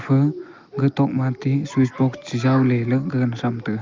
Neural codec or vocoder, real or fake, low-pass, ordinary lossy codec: none; real; 7.2 kHz; Opus, 32 kbps